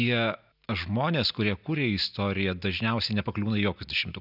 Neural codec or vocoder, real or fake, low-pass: none; real; 5.4 kHz